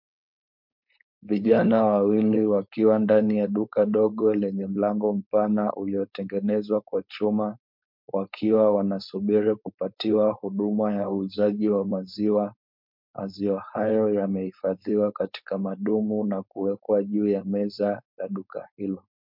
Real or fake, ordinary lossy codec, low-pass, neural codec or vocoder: fake; MP3, 48 kbps; 5.4 kHz; codec, 16 kHz, 4.8 kbps, FACodec